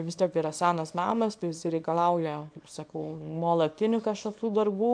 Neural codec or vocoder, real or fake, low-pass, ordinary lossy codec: codec, 24 kHz, 0.9 kbps, WavTokenizer, small release; fake; 9.9 kHz; AAC, 64 kbps